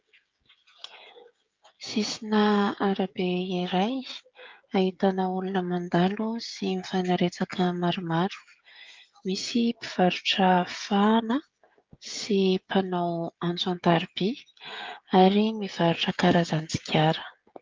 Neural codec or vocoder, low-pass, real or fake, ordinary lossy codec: codec, 16 kHz, 16 kbps, FreqCodec, smaller model; 7.2 kHz; fake; Opus, 24 kbps